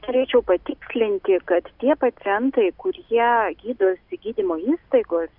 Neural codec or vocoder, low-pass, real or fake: none; 5.4 kHz; real